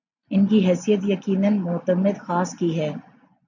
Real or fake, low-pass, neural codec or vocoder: real; 7.2 kHz; none